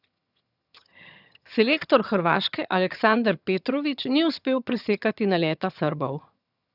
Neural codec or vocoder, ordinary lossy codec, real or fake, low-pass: vocoder, 22.05 kHz, 80 mel bands, HiFi-GAN; none; fake; 5.4 kHz